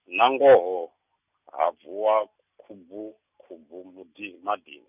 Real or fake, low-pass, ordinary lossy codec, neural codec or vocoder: real; 3.6 kHz; none; none